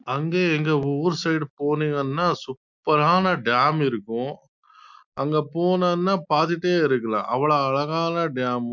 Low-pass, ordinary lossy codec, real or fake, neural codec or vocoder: 7.2 kHz; MP3, 64 kbps; real; none